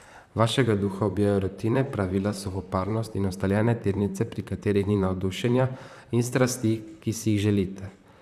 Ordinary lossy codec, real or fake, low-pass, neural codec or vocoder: none; fake; 14.4 kHz; vocoder, 44.1 kHz, 128 mel bands, Pupu-Vocoder